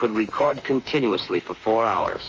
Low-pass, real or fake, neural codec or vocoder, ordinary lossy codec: 7.2 kHz; fake; autoencoder, 48 kHz, 32 numbers a frame, DAC-VAE, trained on Japanese speech; Opus, 24 kbps